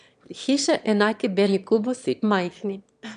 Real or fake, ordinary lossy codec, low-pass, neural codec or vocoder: fake; none; 9.9 kHz; autoencoder, 22.05 kHz, a latent of 192 numbers a frame, VITS, trained on one speaker